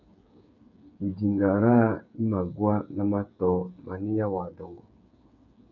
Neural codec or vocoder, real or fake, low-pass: codec, 16 kHz, 8 kbps, FreqCodec, smaller model; fake; 7.2 kHz